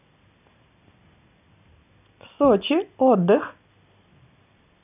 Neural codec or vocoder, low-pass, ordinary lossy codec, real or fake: none; 3.6 kHz; none; real